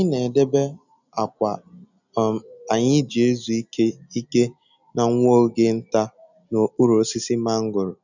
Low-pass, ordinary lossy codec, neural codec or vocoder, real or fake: 7.2 kHz; none; none; real